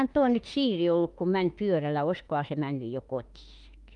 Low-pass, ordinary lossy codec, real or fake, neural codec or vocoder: 10.8 kHz; Opus, 32 kbps; fake; autoencoder, 48 kHz, 32 numbers a frame, DAC-VAE, trained on Japanese speech